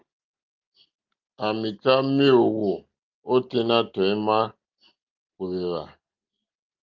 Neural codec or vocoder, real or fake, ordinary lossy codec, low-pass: none; real; Opus, 32 kbps; 7.2 kHz